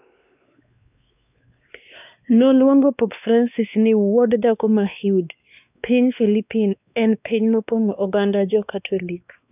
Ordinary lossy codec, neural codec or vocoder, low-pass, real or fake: none; codec, 16 kHz, 2 kbps, X-Codec, WavLM features, trained on Multilingual LibriSpeech; 3.6 kHz; fake